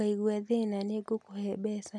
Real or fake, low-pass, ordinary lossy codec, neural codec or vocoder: real; none; none; none